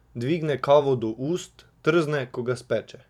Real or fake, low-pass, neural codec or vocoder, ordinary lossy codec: fake; 19.8 kHz; vocoder, 44.1 kHz, 128 mel bands every 512 samples, BigVGAN v2; none